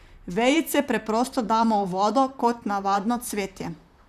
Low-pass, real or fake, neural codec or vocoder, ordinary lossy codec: 14.4 kHz; fake; vocoder, 44.1 kHz, 128 mel bands, Pupu-Vocoder; none